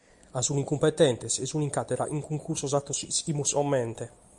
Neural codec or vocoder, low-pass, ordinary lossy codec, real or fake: none; 10.8 kHz; Opus, 64 kbps; real